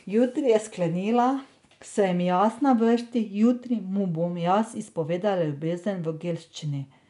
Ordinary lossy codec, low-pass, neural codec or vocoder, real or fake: none; 10.8 kHz; none; real